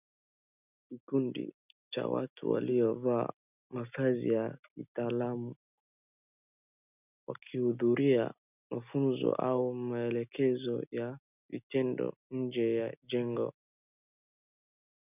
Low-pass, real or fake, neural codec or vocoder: 3.6 kHz; real; none